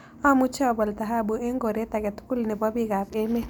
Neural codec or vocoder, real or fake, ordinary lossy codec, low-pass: none; real; none; none